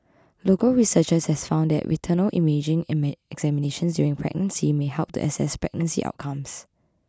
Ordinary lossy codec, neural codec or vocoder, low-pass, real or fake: none; none; none; real